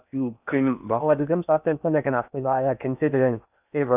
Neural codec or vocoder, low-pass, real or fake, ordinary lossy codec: codec, 16 kHz in and 24 kHz out, 0.6 kbps, FocalCodec, streaming, 4096 codes; 3.6 kHz; fake; none